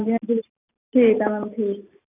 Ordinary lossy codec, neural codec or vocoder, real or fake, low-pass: none; none; real; 3.6 kHz